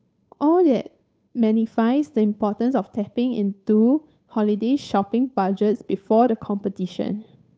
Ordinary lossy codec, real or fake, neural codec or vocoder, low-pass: none; fake; codec, 16 kHz, 8 kbps, FunCodec, trained on Chinese and English, 25 frames a second; none